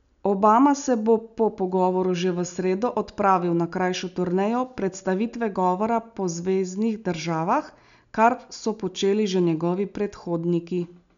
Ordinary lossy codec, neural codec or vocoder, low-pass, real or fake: none; none; 7.2 kHz; real